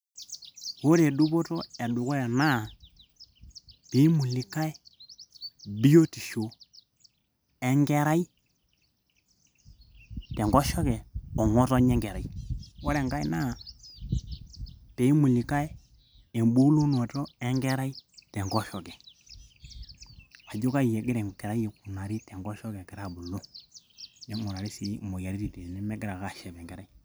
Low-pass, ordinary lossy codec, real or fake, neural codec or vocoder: none; none; real; none